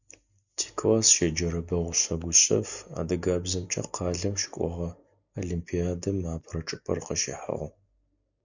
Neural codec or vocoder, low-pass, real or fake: none; 7.2 kHz; real